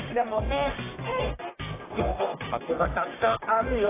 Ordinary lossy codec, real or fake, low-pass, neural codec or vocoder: AAC, 16 kbps; fake; 3.6 kHz; codec, 44.1 kHz, 1.7 kbps, Pupu-Codec